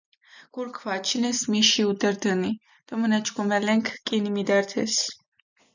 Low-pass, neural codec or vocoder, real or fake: 7.2 kHz; none; real